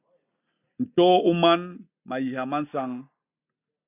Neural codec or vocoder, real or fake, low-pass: none; real; 3.6 kHz